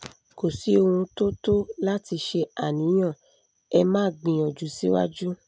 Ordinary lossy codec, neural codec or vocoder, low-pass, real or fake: none; none; none; real